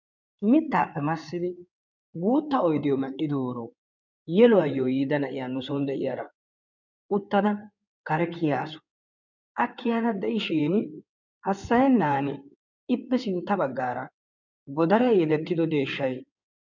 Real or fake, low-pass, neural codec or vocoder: fake; 7.2 kHz; codec, 16 kHz in and 24 kHz out, 2.2 kbps, FireRedTTS-2 codec